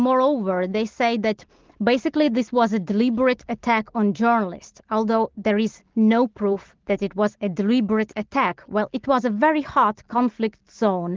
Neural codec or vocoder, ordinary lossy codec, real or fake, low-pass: none; Opus, 16 kbps; real; 7.2 kHz